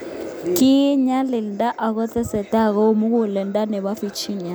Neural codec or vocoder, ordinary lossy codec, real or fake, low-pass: none; none; real; none